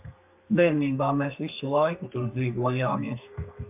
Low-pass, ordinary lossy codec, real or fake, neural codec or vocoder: 3.6 kHz; MP3, 32 kbps; fake; codec, 32 kHz, 1.9 kbps, SNAC